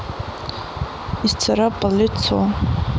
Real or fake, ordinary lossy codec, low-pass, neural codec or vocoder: real; none; none; none